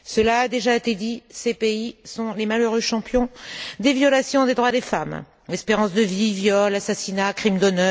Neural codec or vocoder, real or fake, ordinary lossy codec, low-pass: none; real; none; none